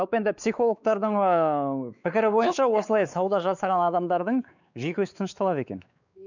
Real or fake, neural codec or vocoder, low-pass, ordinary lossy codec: fake; codec, 16 kHz, 4 kbps, X-Codec, WavLM features, trained on Multilingual LibriSpeech; 7.2 kHz; none